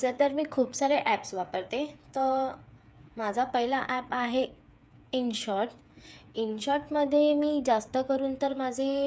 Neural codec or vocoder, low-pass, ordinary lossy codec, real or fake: codec, 16 kHz, 8 kbps, FreqCodec, smaller model; none; none; fake